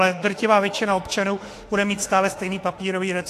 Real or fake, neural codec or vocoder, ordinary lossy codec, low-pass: fake; autoencoder, 48 kHz, 32 numbers a frame, DAC-VAE, trained on Japanese speech; AAC, 48 kbps; 14.4 kHz